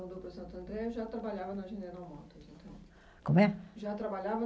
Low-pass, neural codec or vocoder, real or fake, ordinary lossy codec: none; none; real; none